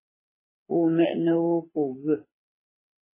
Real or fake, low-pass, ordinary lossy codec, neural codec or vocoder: fake; 3.6 kHz; MP3, 16 kbps; codec, 24 kHz, 6 kbps, HILCodec